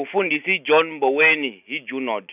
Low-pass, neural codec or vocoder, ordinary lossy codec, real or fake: 3.6 kHz; none; none; real